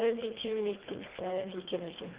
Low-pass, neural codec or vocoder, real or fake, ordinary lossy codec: 3.6 kHz; codec, 24 kHz, 1.5 kbps, HILCodec; fake; Opus, 16 kbps